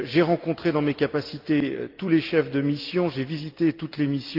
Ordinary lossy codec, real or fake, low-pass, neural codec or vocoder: Opus, 32 kbps; real; 5.4 kHz; none